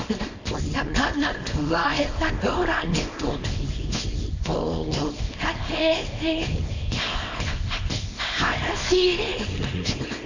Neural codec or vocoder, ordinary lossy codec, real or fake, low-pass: codec, 24 kHz, 0.9 kbps, WavTokenizer, small release; AAC, 48 kbps; fake; 7.2 kHz